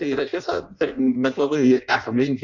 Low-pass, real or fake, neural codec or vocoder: 7.2 kHz; fake; codec, 16 kHz in and 24 kHz out, 0.6 kbps, FireRedTTS-2 codec